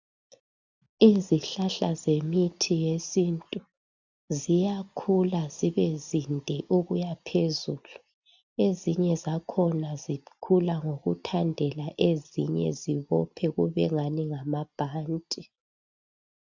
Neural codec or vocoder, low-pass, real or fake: none; 7.2 kHz; real